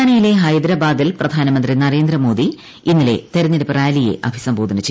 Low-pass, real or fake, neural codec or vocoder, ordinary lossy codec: none; real; none; none